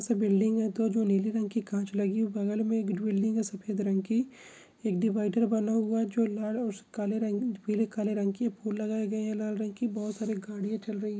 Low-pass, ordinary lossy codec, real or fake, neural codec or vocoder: none; none; real; none